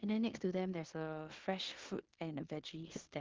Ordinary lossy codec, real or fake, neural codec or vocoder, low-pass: Opus, 16 kbps; fake; codec, 16 kHz in and 24 kHz out, 0.9 kbps, LongCat-Audio-Codec, four codebook decoder; 7.2 kHz